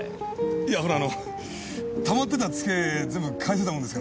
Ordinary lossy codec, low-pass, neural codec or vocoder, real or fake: none; none; none; real